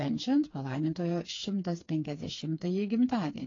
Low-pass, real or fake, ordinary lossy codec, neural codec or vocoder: 7.2 kHz; fake; AAC, 32 kbps; codec, 16 kHz, 4 kbps, FreqCodec, smaller model